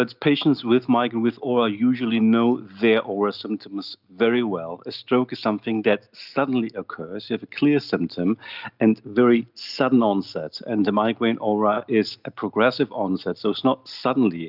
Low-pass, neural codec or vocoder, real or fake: 5.4 kHz; none; real